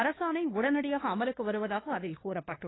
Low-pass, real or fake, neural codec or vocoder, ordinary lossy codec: 7.2 kHz; fake; codec, 16 kHz, 2 kbps, FunCodec, trained on LibriTTS, 25 frames a second; AAC, 16 kbps